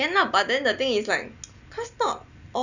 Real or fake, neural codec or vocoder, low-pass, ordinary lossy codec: real; none; 7.2 kHz; none